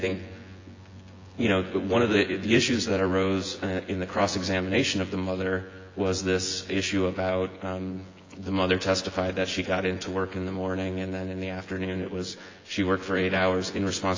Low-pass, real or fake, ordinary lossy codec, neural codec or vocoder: 7.2 kHz; fake; AAC, 32 kbps; vocoder, 24 kHz, 100 mel bands, Vocos